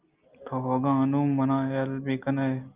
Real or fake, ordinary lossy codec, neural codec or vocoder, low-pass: real; Opus, 24 kbps; none; 3.6 kHz